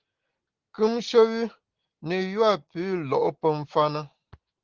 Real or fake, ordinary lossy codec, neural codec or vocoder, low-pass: real; Opus, 16 kbps; none; 7.2 kHz